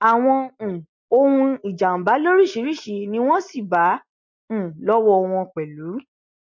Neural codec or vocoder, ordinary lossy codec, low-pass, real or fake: none; MP3, 48 kbps; 7.2 kHz; real